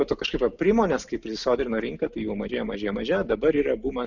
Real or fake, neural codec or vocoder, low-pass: real; none; 7.2 kHz